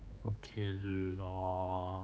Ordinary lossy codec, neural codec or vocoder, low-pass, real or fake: none; codec, 16 kHz, 4 kbps, X-Codec, HuBERT features, trained on general audio; none; fake